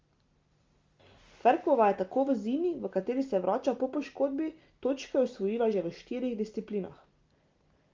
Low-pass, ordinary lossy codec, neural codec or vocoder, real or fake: 7.2 kHz; Opus, 32 kbps; none; real